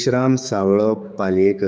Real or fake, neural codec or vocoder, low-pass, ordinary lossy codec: fake; codec, 16 kHz, 4 kbps, X-Codec, HuBERT features, trained on general audio; none; none